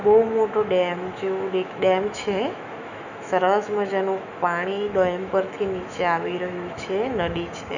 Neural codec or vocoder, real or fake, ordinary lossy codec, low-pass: autoencoder, 48 kHz, 128 numbers a frame, DAC-VAE, trained on Japanese speech; fake; none; 7.2 kHz